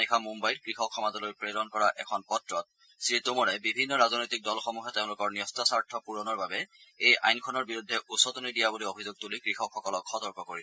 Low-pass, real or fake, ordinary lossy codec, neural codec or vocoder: none; real; none; none